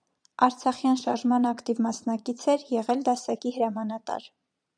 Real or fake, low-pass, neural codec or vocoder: fake; 9.9 kHz; vocoder, 22.05 kHz, 80 mel bands, Vocos